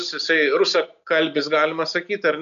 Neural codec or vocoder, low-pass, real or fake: none; 7.2 kHz; real